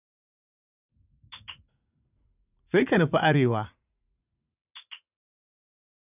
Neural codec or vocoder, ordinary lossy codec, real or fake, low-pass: vocoder, 44.1 kHz, 80 mel bands, Vocos; none; fake; 3.6 kHz